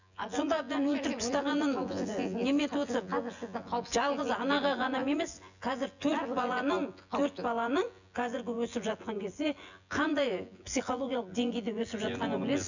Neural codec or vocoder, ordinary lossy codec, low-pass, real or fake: vocoder, 24 kHz, 100 mel bands, Vocos; none; 7.2 kHz; fake